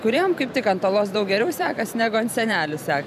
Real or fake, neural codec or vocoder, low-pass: fake; vocoder, 44.1 kHz, 128 mel bands every 512 samples, BigVGAN v2; 14.4 kHz